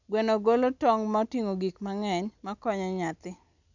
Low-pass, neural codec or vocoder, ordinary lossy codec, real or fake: 7.2 kHz; none; none; real